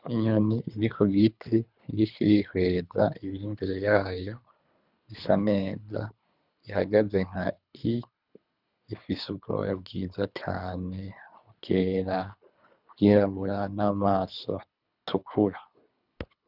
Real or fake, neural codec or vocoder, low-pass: fake; codec, 24 kHz, 3 kbps, HILCodec; 5.4 kHz